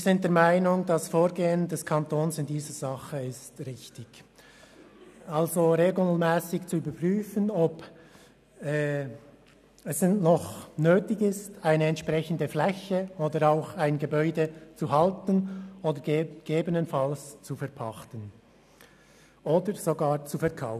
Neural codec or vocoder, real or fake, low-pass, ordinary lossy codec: none; real; 14.4 kHz; none